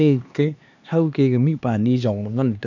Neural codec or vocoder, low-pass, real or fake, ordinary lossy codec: codec, 16 kHz, 2 kbps, X-Codec, HuBERT features, trained on LibriSpeech; 7.2 kHz; fake; none